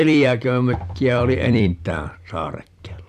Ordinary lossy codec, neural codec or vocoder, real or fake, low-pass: none; vocoder, 44.1 kHz, 128 mel bands every 256 samples, BigVGAN v2; fake; 14.4 kHz